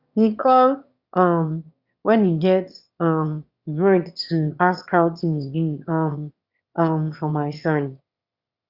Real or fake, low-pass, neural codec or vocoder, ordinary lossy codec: fake; 5.4 kHz; autoencoder, 22.05 kHz, a latent of 192 numbers a frame, VITS, trained on one speaker; Opus, 64 kbps